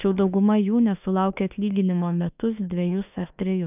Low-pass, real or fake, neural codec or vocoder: 3.6 kHz; fake; autoencoder, 48 kHz, 32 numbers a frame, DAC-VAE, trained on Japanese speech